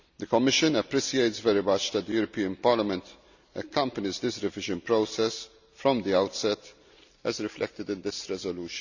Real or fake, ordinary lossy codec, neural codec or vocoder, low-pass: real; none; none; 7.2 kHz